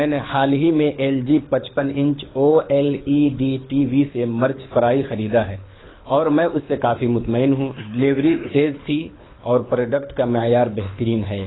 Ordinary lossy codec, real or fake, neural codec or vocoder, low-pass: AAC, 16 kbps; fake; codec, 24 kHz, 6 kbps, HILCodec; 7.2 kHz